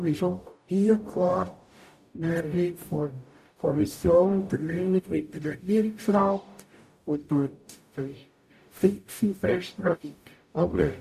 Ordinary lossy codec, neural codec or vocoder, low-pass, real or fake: none; codec, 44.1 kHz, 0.9 kbps, DAC; 14.4 kHz; fake